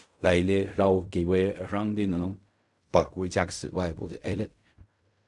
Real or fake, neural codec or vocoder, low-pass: fake; codec, 16 kHz in and 24 kHz out, 0.4 kbps, LongCat-Audio-Codec, fine tuned four codebook decoder; 10.8 kHz